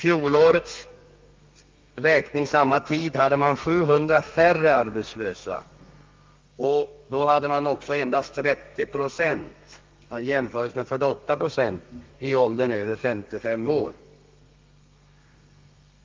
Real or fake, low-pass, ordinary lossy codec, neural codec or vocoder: fake; 7.2 kHz; Opus, 32 kbps; codec, 32 kHz, 1.9 kbps, SNAC